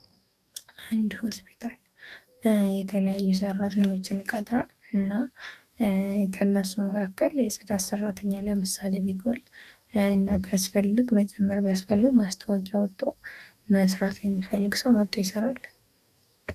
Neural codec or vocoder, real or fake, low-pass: codec, 44.1 kHz, 2.6 kbps, DAC; fake; 14.4 kHz